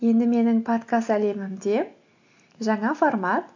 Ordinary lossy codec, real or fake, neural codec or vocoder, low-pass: AAC, 48 kbps; real; none; 7.2 kHz